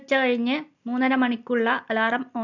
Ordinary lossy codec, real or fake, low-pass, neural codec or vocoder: none; real; 7.2 kHz; none